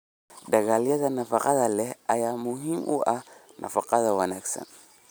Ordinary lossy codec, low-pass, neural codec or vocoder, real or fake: none; none; none; real